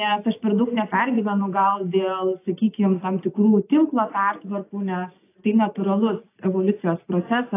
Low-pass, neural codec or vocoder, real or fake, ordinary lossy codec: 3.6 kHz; none; real; AAC, 24 kbps